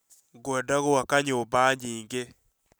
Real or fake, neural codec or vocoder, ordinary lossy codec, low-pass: real; none; none; none